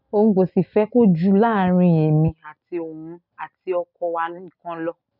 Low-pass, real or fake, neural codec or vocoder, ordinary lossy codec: 5.4 kHz; real; none; none